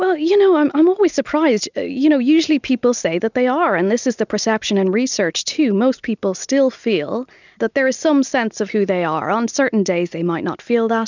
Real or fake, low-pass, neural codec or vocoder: real; 7.2 kHz; none